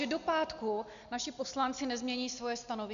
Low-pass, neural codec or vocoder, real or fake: 7.2 kHz; none; real